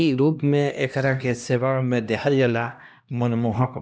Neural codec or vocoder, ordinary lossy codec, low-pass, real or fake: codec, 16 kHz, 1 kbps, X-Codec, HuBERT features, trained on LibriSpeech; none; none; fake